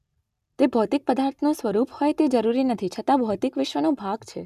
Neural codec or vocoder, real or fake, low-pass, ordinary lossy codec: none; real; 14.4 kHz; none